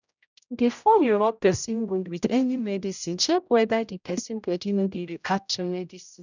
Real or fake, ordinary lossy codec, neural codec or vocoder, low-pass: fake; none; codec, 16 kHz, 0.5 kbps, X-Codec, HuBERT features, trained on general audio; 7.2 kHz